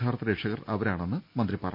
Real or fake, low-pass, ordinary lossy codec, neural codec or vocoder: real; 5.4 kHz; MP3, 48 kbps; none